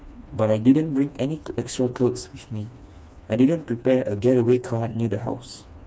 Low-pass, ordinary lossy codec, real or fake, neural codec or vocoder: none; none; fake; codec, 16 kHz, 2 kbps, FreqCodec, smaller model